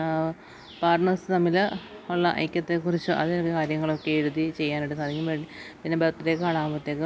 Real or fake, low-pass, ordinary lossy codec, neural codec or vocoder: real; none; none; none